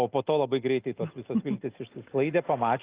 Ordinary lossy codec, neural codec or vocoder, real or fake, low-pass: Opus, 32 kbps; none; real; 3.6 kHz